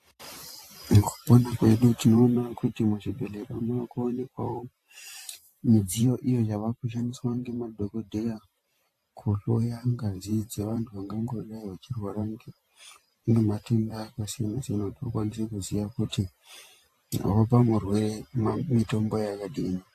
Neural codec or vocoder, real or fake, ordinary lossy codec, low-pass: vocoder, 44.1 kHz, 128 mel bands every 512 samples, BigVGAN v2; fake; AAC, 64 kbps; 14.4 kHz